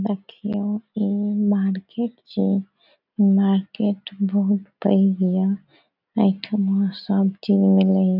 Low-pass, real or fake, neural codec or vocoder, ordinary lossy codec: 5.4 kHz; real; none; none